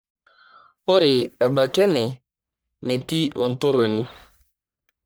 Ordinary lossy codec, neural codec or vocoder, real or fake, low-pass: none; codec, 44.1 kHz, 1.7 kbps, Pupu-Codec; fake; none